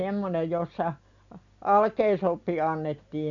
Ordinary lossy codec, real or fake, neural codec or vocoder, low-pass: none; real; none; 7.2 kHz